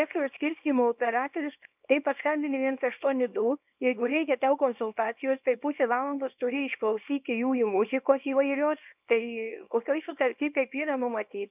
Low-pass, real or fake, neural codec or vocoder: 3.6 kHz; fake; codec, 24 kHz, 0.9 kbps, WavTokenizer, small release